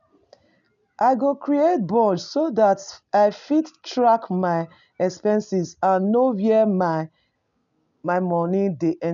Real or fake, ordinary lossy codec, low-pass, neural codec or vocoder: real; none; 7.2 kHz; none